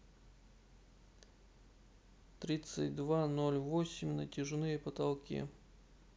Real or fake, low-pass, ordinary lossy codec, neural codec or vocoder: real; none; none; none